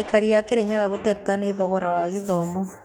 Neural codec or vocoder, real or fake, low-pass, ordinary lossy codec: codec, 44.1 kHz, 2.6 kbps, DAC; fake; none; none